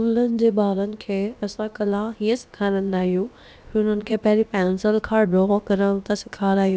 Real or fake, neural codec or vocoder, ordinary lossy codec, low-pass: fake; codec, 16 kHz, about 1 kbps, DyCAST, with the encoder's durations; none; none